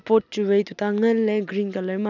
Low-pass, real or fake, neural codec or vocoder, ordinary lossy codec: 7.2 kHz; real; none; none